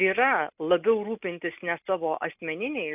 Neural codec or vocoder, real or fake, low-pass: none; real; 3.6 kHz